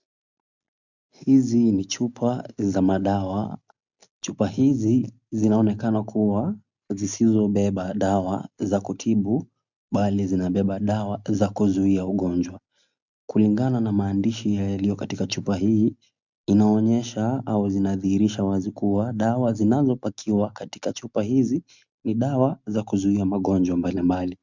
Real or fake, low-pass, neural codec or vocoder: real; 7.2 kHz; none